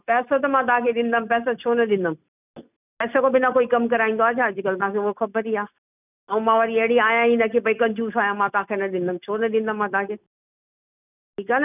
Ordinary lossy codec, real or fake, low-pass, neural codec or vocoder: none; real; 3.6 kHz; none